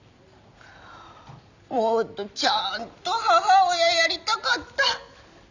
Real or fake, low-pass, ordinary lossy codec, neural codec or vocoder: real; 7.2 kHz; none; none